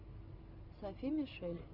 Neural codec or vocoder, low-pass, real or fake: none; 5.4 kHz; real